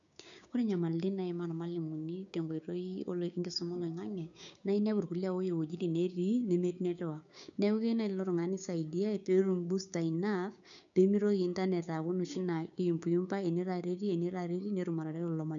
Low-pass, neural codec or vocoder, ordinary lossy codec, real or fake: 7.2 kHz; codec, 16 kHz, 6 kbps, DAC; none; fake